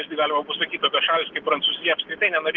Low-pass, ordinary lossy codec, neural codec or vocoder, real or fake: 7.2 kHz; Opus, 16 kbps; none; real